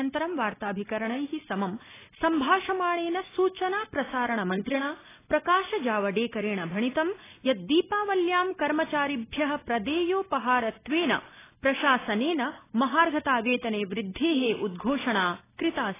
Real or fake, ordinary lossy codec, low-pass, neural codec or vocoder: real; AAC, 16 kbps; 3.6 kHz; none